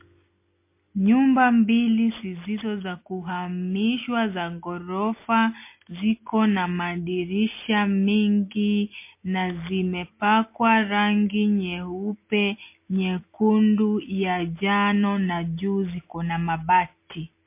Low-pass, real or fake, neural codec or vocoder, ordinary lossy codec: 3.6 kHz; real; none; MP3, 24 kbps